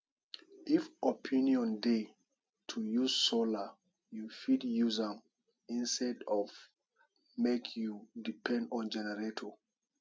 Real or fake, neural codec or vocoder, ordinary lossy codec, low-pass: real; none; none; none